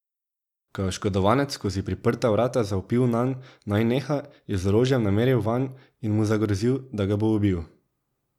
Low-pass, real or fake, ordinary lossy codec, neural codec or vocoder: 19.8 kHz; real; none; none